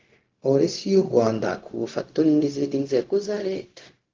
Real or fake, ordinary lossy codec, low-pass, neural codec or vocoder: fake; Opus, 16 kbps; 7.2 kHz; codec, 16 kHz, 0.4 kbps, LongCat-Audio-Codec